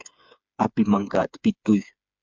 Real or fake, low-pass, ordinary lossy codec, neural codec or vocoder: fake; 7.2 kHz; MP3, 64 kbps; codec, 16 kHz, 4 kbps, FreqCodec, smaller model